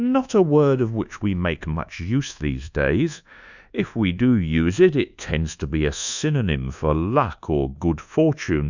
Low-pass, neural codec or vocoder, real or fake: 7.2 kHz; codec, 24 kHz, 1.2 kbps, DualCodec; fake